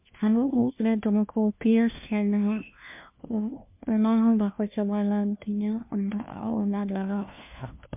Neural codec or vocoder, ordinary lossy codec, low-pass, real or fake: codec, 16 kHz, 1 kbps, FunCodec, trained on LibriTTS, 50 frames a second; MP3, 32 kbps; 3.6 kHz; fake